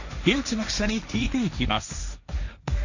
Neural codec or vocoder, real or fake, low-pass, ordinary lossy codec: codec, 16 kHz, 1.1 kbps, Voila-Tokenizer; fake; 7.2 kHz; none